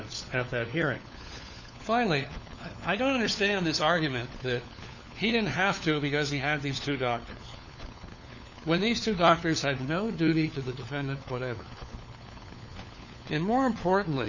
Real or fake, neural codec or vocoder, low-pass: fake; codec, 16 kHz, 4 kbps, FunCodec, trained on LibriTTS, 50 frames a second; 7.2 kHz